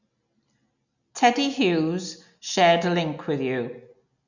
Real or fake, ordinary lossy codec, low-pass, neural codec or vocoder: real; none; 7.2 kHz; none